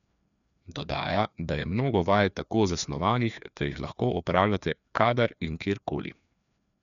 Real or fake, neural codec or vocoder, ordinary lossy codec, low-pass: fake; codec, 16 kHz, 2 kbps, FreqCodec, larger model; none; 7.2 kHz